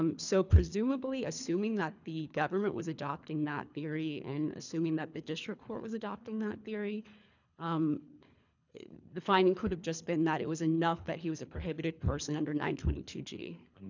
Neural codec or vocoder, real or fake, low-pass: codec, 24 kHz, 3 kbps, HILCodec; fake; 7.2 kHz